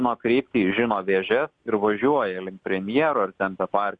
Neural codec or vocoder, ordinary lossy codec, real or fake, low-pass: none; Opus, 64 kbps; real; 9.9 kHz